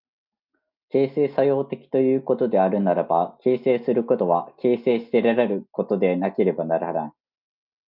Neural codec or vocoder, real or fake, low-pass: none; real; 5.4 kHz